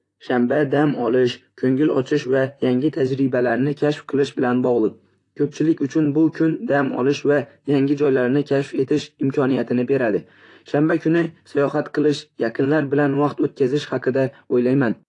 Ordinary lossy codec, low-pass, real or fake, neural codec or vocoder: AAC, 48 kbps; 10.8 kHz; fake; vocoder, 44.1 kHz, 128 mel bands, Pupu-Vocoder